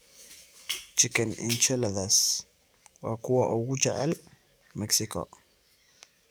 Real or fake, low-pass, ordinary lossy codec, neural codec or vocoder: fake; none; none; codec, 44.1 kHz, 7.8 kbps, DAC